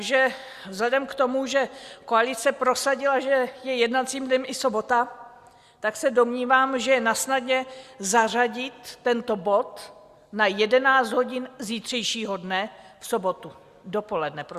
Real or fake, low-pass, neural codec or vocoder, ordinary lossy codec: real; 14.4 kHz; none; Opus, 64 kbps